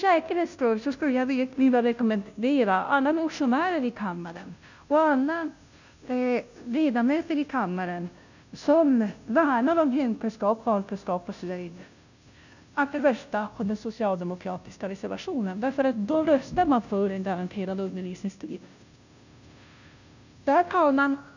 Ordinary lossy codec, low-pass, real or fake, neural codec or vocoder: none; 7.2 kHz; fake; codec, 16 kHz, 0.5 kbps, FunCodec, trained on Chinese and English, 25 frames a second